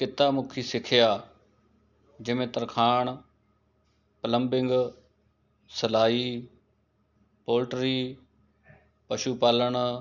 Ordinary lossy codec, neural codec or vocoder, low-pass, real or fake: none; none; 7.2 kHz; real